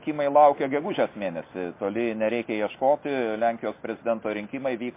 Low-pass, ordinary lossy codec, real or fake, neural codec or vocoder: 3.6 kHz; MP3, 24 kbps; real; none